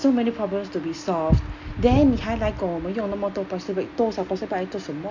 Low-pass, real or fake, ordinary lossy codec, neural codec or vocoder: 7.2 kHz; real; none; none